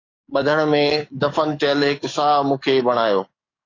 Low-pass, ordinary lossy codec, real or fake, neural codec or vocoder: 7.2 kHz; AAC, 32 kbps; fake; codec, 44.1 kHz, 7.8 kbps, DAC